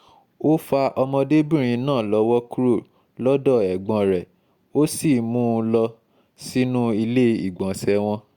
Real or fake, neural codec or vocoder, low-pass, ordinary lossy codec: real; none; 19.8 kHz; Opus, 64 kbps